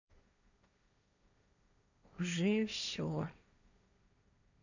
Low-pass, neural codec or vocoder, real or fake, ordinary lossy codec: 7.2 kHz; codec, 16 kHz, 1.1 kbps, Voila-Tokenizer; fake; none